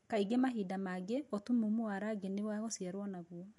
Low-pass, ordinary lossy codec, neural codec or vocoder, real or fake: 10.8 kHz; MP3, 48 kbps; none; real